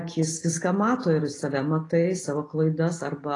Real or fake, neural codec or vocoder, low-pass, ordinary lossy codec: real; none; 10.8 kHz; AAC, 32 kbps